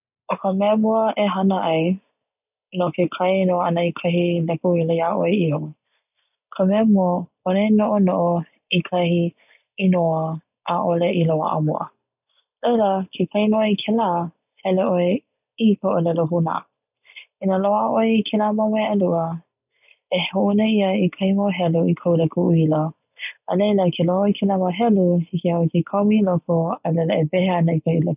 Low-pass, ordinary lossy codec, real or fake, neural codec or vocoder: 3.6 kHz; none; real; none